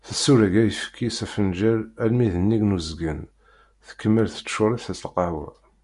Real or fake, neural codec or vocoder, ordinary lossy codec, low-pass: real; none; MP3, 96 kbps; 10.8 kHz